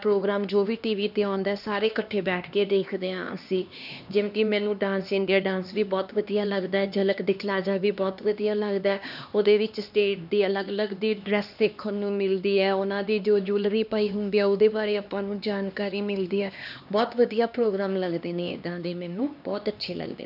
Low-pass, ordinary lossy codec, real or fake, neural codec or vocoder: 5.4 kHz; none; fake; codec, 16 kHz, 2 kbps, X-Codec, HuBERT features, trained on LibriSpeech